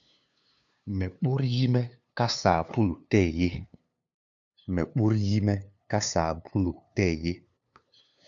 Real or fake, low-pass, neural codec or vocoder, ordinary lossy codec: fake; 7.2 kHz; codec, 16 kHz, 2 kbps, FunCodec, trained on LibriTTS, 25 frames a second; MP3, 96 kbps